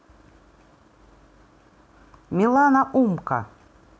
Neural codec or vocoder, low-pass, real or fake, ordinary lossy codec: none; none; real; none